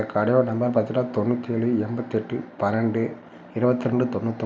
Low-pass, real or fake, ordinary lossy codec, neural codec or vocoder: none; real; none; none